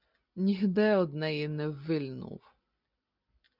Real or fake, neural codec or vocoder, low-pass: real; none; 5.4 kHz